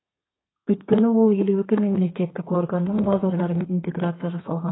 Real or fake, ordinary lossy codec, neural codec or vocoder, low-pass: fake; AAC, 16 kbps; codec, 32 kHz, 1.9 kbps, SNAC; 7.2 kHz